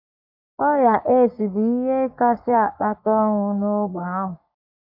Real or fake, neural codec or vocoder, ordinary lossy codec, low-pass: fake; codec, 44.1 kHz, 3.4 kbps, Pupu-Codec; AAC, 48 kbps; 5.4 kHz